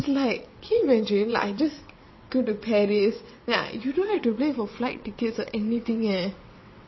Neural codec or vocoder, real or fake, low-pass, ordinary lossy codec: vocoder, 22.05 kHz, 80 mel bands, WaveNeXt; fake; 7.2 kHz; MP3, 24 kbps